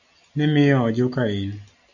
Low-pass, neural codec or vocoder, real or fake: 7.2 kHz; none; real